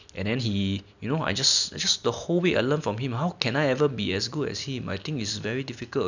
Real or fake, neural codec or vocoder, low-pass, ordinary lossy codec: real; none; 7.2 kHz; none